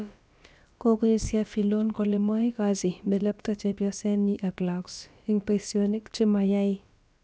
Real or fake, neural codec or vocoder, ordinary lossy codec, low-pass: fake; codec, 16 kHz, about 1 kbps, DyCAST, with the encoder's durations; none; none